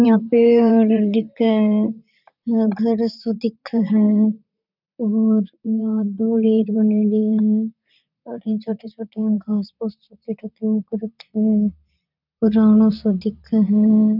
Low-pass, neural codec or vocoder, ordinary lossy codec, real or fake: 5.4 kHz; vocoder, 44.1 kHz, 128 mel bands, Pupu-Vocoder; none; fake